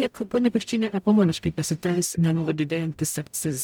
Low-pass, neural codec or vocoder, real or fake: 19.8 kHz; codec, 44.1 kHz, 0.9 kbps, DAC; fake